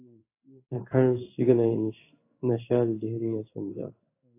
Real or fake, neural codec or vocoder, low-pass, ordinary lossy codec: fake; codec, 16 kHz in and 24 kHz out, 1 kbps, XY-Tokenizer; 3.6 kHz; AAC, 24 kbps